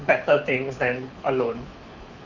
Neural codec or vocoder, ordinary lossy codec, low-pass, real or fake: codec, 24 kHz, 6 kbps, HILCodec; Opus, 64 kbps; 7.2 kHz; fake